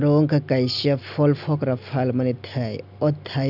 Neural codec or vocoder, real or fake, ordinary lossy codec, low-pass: none; real; none; 5.4 kHz